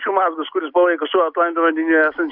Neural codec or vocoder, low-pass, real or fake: none; 5.4 kHz; real